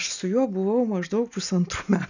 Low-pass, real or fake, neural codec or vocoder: 7.2 kHz; real; none